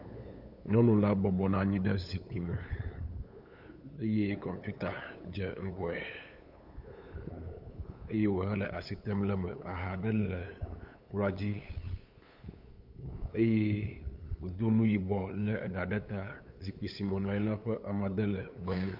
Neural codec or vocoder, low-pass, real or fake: codec, 16 kHz, 8 kbps, FunCodec, trained on LibriTTS, 25 frames a second; 5.4 kHz; fake